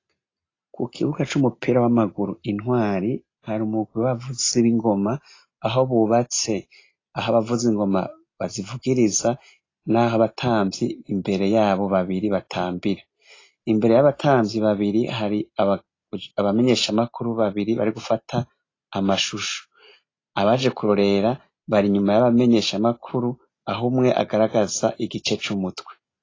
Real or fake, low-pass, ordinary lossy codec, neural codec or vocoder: real; 7.2 kHz; AAC, 32 kbps; none